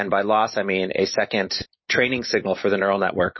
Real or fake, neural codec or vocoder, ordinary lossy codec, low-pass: real; none; MP3, 24 kbps; 7.2 kHz